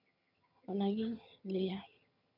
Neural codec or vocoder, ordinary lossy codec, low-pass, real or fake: vocoder, 22.05 kHz, 80 mel bands, HiFi-GAN; none; 5.4 kHz; fake